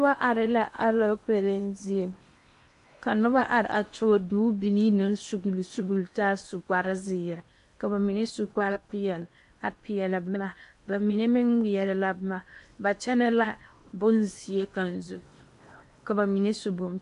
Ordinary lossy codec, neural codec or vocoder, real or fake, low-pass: AAC, 64 kbps; codec, 16 kHz in and 24 kHz out, 0.8 kbps, FocalCodec, streaming, 65536 codes; fake; 10.8 kHz